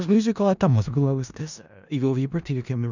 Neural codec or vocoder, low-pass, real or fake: codec, 16 kHz in and 24 kHz out, 0.4 kbps, LongCat-Audio-Codec, four codebook decoder; 7.2 kHz; fake